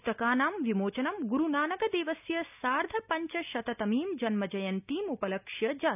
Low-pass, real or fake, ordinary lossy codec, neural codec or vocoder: 3.6 kHz; real; none; none